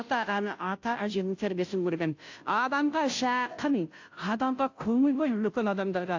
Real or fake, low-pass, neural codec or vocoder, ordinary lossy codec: fake; 7.2 kHz; codec, 16 kHz, 0.5 kbps, FunCodec, trained on Chinese and English, 25 frames a second; AAC, 48 kbps